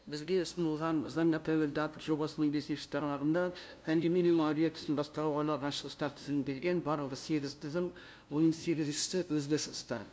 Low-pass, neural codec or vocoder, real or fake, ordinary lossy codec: none; codec, 16 kHz, 0.5 kbps, FunCodec, trained on LibriTTS, 25 frames a second; fake; none